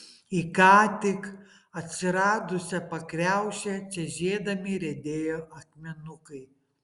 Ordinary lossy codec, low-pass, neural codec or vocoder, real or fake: Opus, 64 kbps; 10.8 kHz; none; real